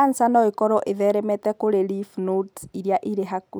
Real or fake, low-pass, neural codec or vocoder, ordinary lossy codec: real; none; none; none